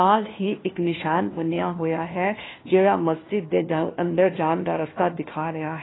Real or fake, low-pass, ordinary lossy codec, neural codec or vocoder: fake; 7.2 kHz; AAC, 16 kbps; codec, 16 kHz, 1 kbps, FunCodec, trained on LibriTTS, 50 frames a second